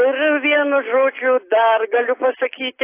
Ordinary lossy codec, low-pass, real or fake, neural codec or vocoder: AAC, 24 kbps; 3.6 kHz; real; none